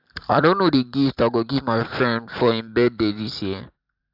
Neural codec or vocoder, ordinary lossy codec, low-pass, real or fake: vocoder, 44.1 kHz, 128 mel bands every 512 samples, BigVGAN v2; AAC, 48 kbps; 5.4 kHz; fake